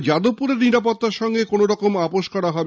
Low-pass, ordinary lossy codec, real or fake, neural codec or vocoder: none; none; real; none